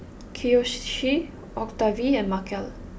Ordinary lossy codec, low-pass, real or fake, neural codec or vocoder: none; none; real; none